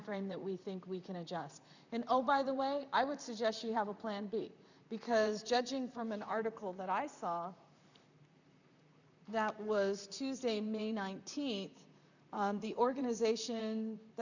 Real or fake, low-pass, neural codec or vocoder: fake; 7.2 kHz; vocoder, 44.1 kHz, 128 mel bands, Pupu-Vocoder